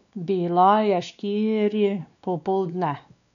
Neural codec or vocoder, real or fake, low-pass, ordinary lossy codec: codec, 16 kHz, 2 kbps, X-Codec, WavLM features, trained on Multilingual LibriSpeech; fake; 7.2 kHz; none